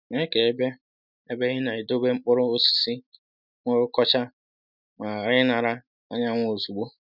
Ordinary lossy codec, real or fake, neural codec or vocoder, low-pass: none; real; none; 5.4 kHz